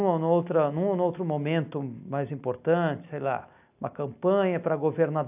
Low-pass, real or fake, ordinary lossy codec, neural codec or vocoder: 3.6 kHz; real; AAC, 32 kbps; none